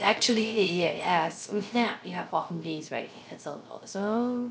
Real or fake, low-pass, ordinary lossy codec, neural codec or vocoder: fake; none; none; codec, 16 kHz, 0.3 kbps, FocalCodec